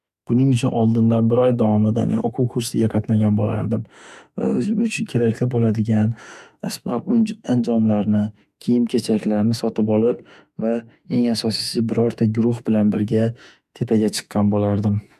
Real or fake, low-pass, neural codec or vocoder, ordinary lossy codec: fake; 14.4 kHz; autoencoder, 48 kHz, 32 numbers a frame, DAC-VAE, trained on Japanese speech; none